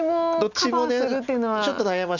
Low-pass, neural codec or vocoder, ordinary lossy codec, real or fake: 7.2 kHz; none; none; real